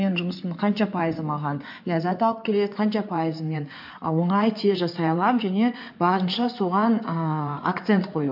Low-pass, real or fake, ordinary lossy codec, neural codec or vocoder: 5.4 kHz; fake; MP3, 48 kbps; codec, 16 kHz in and 24 kHz out, 2.2 kbps, FireRedTTS-2 codec